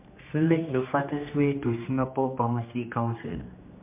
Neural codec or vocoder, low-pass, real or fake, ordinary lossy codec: codec, 16 kHz, 2 kbps, X-Codec, HuBERT features, trained on general audio; 3.6 kHz; fake; MP3, 32 kbps